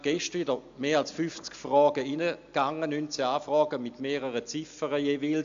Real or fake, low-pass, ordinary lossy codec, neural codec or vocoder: real; 7.2 kHz; AAC, 64 kbps; none